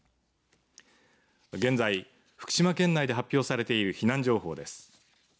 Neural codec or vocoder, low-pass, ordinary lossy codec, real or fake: none; none; none; real